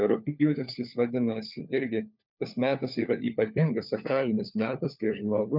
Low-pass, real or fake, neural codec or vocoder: 5.4 kHz; fake; codec, 16 kHz, 4 kbps, FunCodec, trained on LibriTTS, 50 frames a second